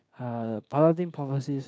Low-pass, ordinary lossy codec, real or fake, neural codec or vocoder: none; none; fake; codec, 16 kHz, 6 kbps, DAC